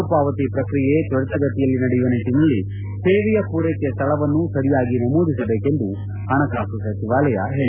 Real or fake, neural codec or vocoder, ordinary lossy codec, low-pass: real; none; none; 3.6 kHz